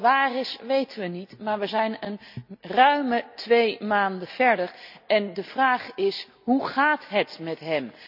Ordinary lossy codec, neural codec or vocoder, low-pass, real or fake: none; none; 5.4 kHz; real